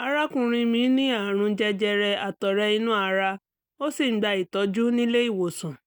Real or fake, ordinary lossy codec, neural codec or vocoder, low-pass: real; none; none; 19.8 kHz